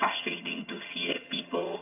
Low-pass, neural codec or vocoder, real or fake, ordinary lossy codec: 3.6 kHz; vocoder, 22.05 kHz, 80 mel bands, HiFi-GAN; fake; AAC, 24 kbps